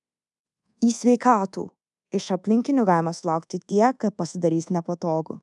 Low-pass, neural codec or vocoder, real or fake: 10.8 kHz; codec, 24 kHz, 1.2 kbps, DualCodec; fake